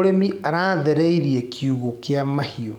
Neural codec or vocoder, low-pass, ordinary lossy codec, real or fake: codec, 44.1 kHz, 7.8 kbps, DAC; 19.8 kHz; none; fake